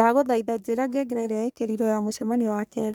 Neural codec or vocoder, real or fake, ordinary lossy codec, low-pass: codec, 44.1 kHz, 3.4 kbps, Pupu-Codec; fake; none; none